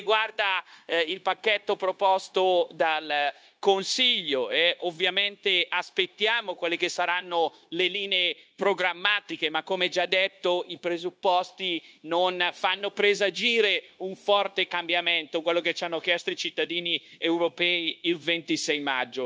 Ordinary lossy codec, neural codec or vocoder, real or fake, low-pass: none; codec, 16 kHz, 0.9 kbps, LongCat-Audio-Codec; fake; none